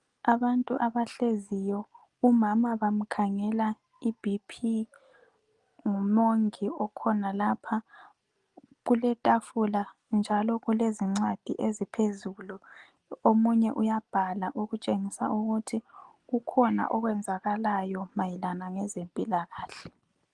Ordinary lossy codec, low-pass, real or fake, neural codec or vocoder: Opus, 24 kbps; 10.8 kHz; real; none